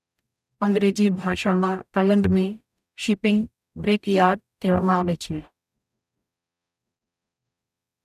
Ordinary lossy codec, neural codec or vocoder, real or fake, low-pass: none; codec, 44.1 kHz, 0.9 kbps, DAC; fake; 14.4 kHz